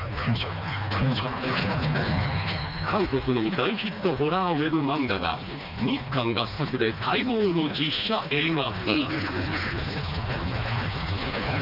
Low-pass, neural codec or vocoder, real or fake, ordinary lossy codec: 5.4 kHz; codec, 16 kHz, 2 kbps, FreqCodec, smaller model; fake; none